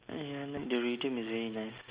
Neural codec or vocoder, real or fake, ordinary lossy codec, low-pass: none; real; Opus, 24 kbps; 3.6 kHz